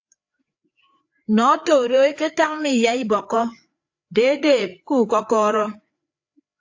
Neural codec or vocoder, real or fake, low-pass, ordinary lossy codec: codec, 16 kHz, 4 kbps, FreqCodec, larger model; fake; 7.2 kHz; AAC, 48 kbps